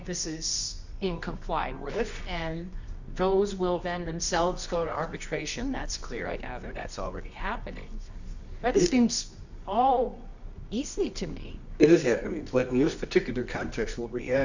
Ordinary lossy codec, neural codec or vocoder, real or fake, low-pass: Opus, 64 kbps; codec, 24 kHz, 0.9 kbps, WavTokenizer, medium music audio release; fake; 7.2 kHz